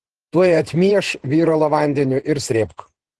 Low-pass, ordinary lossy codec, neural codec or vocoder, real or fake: 10.8 kHz; Opus, 16 kbps; vocoder, 48 kHz, 128 mel bands, Vocos; fake